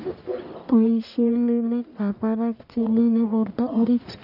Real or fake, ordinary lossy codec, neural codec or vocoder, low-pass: fake; none; codec, 44.1 kHz, 1.7 kbps, Pupu-Codec; 5.4 kHz